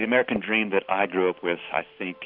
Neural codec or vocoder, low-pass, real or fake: none; 5.4 kHz; real